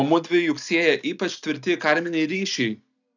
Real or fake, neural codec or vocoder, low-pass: real; none; 7.2 kHz